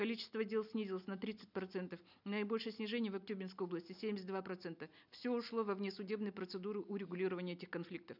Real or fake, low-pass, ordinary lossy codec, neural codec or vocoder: real; 5.4 kHz; none; none